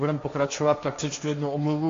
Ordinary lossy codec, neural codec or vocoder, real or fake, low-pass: AAC, 48 kbps; codec, 16 kHz, 1.1 kbps, Voila-Tokenizer; fake; 7.2 kHz